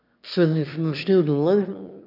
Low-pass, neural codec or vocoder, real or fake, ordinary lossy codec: 5.4 kHz; autoencoder, 22.05 kHz, a latent of 192 numbers a frame, VITS, trained on one speaker; fake; none